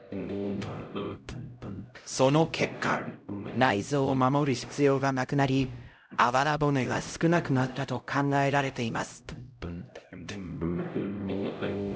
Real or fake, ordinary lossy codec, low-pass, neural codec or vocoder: fake; none; none; codec, 16 kHz, 0.5 kbps, X-Codec, HuBERT features, trained on LibriSpeech